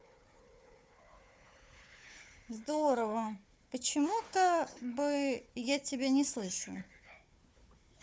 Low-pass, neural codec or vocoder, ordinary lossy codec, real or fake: none; codec, 16 kHz, 4 kbps, FunCodec, trained on Chinese and English, 50 frames a second; none; fake